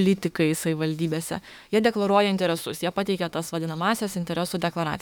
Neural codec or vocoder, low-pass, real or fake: autoencoder, 48 kHz, 32 numbers a frame, DAC-VAE, trained on Japanese speech; 19.8 kHz; fake